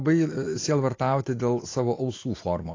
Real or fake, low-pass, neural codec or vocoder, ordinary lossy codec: real; 7.2 kHz; none; AAC, 32 kbps